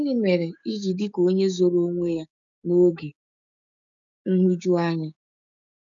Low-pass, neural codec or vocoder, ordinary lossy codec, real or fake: 7.2 kHz; codec, 16 kHz, 6 kbps, DAC; MP3, 96 kbps; fake